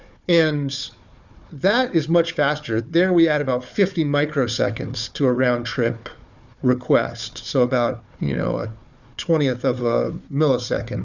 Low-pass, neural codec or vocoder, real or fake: 7.2 kHz; codec, 16 kHz, 4 kbps, FunCodec, trained on Chinese and English, 50 frames a second; fake